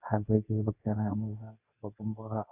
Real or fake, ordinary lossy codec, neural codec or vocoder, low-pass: fake; none; codec, 24 kHz, 0.9 kbps, WavTokenizer, medium speech release version 2; 3.6 kHz